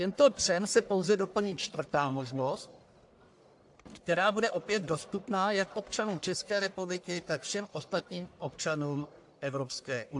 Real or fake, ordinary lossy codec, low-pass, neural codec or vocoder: fake; AAC, 64 kbps; 10.8 kHz; codec, 44.1 kHz, 1.7 kbps, Pupu-Codec